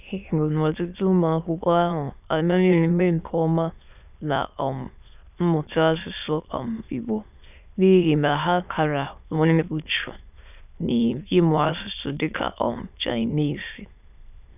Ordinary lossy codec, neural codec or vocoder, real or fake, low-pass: none; autoencoder, 22.05 kHz, a latent of 192 numbers a frame, VITS, trained on many speakers; fake; 3.6 kHz